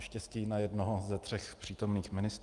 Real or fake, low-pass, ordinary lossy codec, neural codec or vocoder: real; 10.8 kHz; Opus, 32 kbps; none